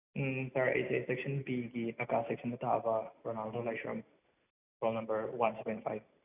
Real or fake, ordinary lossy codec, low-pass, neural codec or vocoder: real; none; 3.6 kHz; none